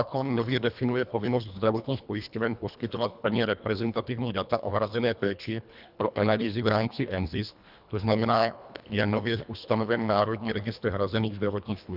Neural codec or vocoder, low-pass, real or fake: codec, 24 kHz, 1.5 kbps, HILCodec; 5.4 kHz; fake